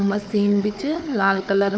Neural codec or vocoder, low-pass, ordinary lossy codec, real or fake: codec, 16 kHz, 4 kbps, FunCodec, trained on Chinese and English, 50 frames a second; none; none; fake